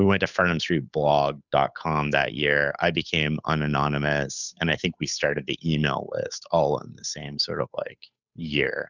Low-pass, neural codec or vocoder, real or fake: 7.2 kHz; codec, 16 kHz, 8 kbps, FunCodec, trained on Chinese and English, 25 frames a second; fake